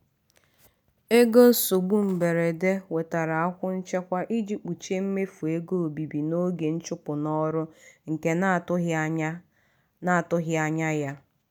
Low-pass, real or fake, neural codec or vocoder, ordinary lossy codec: none; real; none; none